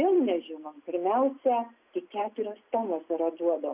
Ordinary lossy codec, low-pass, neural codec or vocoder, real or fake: Opus, 64 kbps; 3.6 kHz; none; real